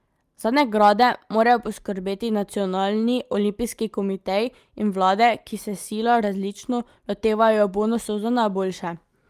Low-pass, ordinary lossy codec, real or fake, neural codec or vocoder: 14.4 kHz; Opus, 32 kbps; real; none